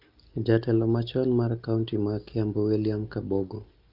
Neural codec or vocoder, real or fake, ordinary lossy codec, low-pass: none; real; Opus, 24 kbps; 5.4 kHz